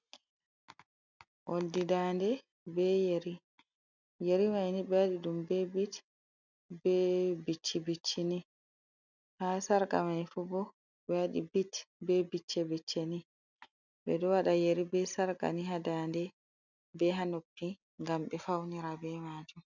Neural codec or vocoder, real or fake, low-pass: none; real; 7.2 kHz